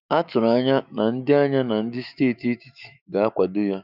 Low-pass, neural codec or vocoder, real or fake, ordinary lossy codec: 5.4 kHz; autoencoder, 48 kHz, 128 numbers a frame, DAC-VAE, trained on Japanese speech; fake; none